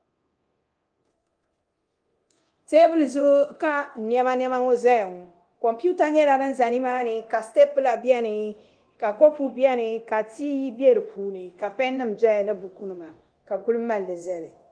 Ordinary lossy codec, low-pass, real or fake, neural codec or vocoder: Opus, 24 kbps; 9.9 kHz; fake; codec, 24 kHz, 0.9 kbps, DualCodec